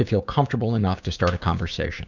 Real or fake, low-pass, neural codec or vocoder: fake; 7.2 kHz; codec, 16 kHz, 6 kbps, DAC